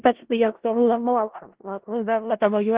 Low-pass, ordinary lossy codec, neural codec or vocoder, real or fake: 3.6 kHz; Opus, 16 kbps; codec, 16 kHz in and 24 kHz out, 0.4 kbps, LongCat-Audio-Codec, four codebook decoder; fake